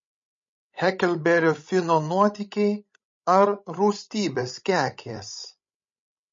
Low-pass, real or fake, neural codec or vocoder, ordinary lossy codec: 7.2 kHz; fake; codec, 16 kHz, 16 kbps, FreqCodec, larger model; MP3, 32 kbps